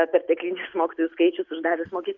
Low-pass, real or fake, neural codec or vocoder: 7.2 kHz; real; none